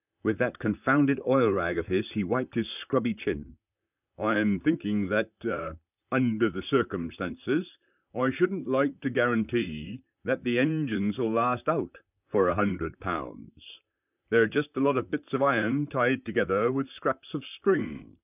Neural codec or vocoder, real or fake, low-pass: vocoder, 44.1 kHz, 128 mel bands, Pupu-Vocoder; fake; 3.6 kHz